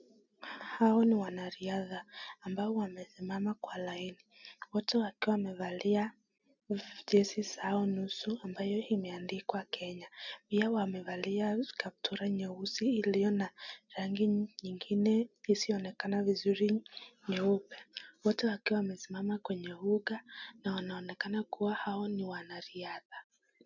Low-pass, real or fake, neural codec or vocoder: 7.2 kHz; real; none